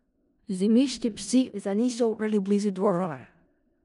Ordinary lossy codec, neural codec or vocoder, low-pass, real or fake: none; codec, 16 kHz in and 24 kHz out, 0.4 kbps, LongCat-Audio-Codec, four codebook decoder; 10.8 kHz; fake